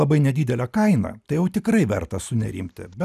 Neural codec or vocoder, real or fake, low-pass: none; real; 14.4 kHz